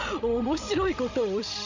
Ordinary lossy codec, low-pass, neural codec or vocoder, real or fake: MP3, 64 kbps; 7.2 kHz; codec, 16 kHz, 16 kbps, FreqCodec, larger model; fake